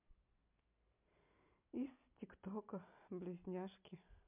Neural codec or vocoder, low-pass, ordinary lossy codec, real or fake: none; 3.6 kHz; MP3, 32 kbps; real